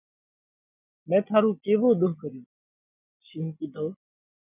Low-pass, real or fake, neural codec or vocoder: 3.6 kHz; real; none